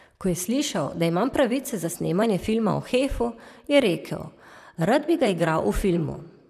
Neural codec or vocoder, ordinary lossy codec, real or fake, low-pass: vocoder, 44.1 kHz, 128 mel bands, Pupu-Vocoder; none; fake; 14.4 kHz